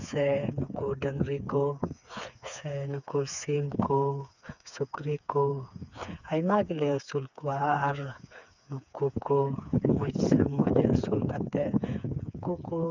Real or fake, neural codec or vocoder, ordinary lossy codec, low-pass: fake; codec, 16 kHz, 4 kbps, FreqCodec, smaller model; none; 7.2 kHz